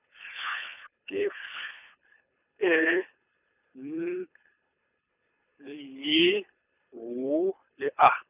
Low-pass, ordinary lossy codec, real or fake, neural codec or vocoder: 3.6 kHz; none; fake; codec, 24 kHz, 3 kbps, HILCodec